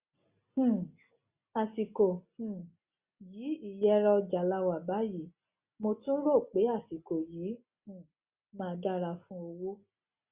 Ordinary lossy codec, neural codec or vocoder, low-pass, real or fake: Opus, 64 kbps; none; 3.6 kHz; real